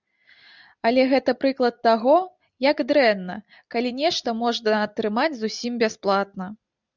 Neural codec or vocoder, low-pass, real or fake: none; 7.2 kHz; real